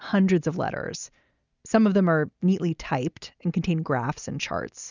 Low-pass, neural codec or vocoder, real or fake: 7.2 kHz; none; real